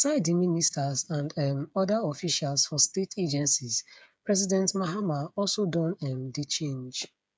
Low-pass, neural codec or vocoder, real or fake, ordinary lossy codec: none; codec, 16 kHz, 8 kbps, FreqCodec, smaller model; fake; none